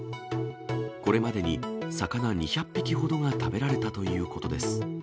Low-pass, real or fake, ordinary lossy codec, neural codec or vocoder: none; real; none; none